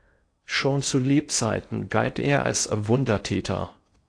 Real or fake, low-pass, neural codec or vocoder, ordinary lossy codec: fake; 9.9 kHz; codec, 16 kHz in and 24 kHz out, 0.6 kbps, FocalCodec, streaming, 2048 codes; AAC, 48 kbps